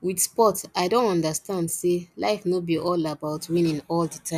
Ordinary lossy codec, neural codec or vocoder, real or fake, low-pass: none; none; real; 14.4 kHz